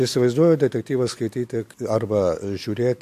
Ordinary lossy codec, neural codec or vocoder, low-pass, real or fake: MP3, 64 kbps; none; 14.4 kHz; real